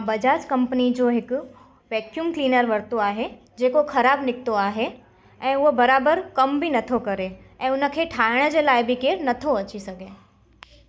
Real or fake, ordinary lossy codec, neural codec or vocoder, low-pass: real; none; none; none